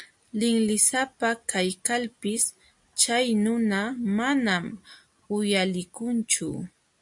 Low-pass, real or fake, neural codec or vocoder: 10.8 kHz; real; none